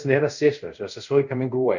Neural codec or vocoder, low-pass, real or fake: codec, 24 kHz, 0.5 kbps, DualCodec; 7.2 kHz; fake